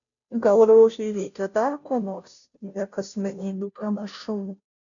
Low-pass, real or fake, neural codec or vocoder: 7.2 kHz; fake; codec, 16 kHz, 0.5 kbps, FunCodec, trained on Chinese and English, 25 frames a second